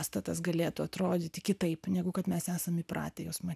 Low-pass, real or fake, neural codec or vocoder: 14.4 kHz; real; none